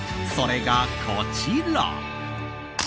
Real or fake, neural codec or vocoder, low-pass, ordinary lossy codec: real; none; none; none